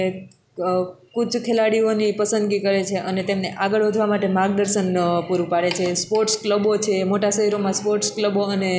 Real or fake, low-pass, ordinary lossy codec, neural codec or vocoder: real; none; none; none